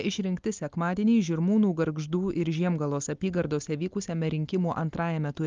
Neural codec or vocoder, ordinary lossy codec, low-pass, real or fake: none; Opus, 32 kbps; 7.2 kHz; real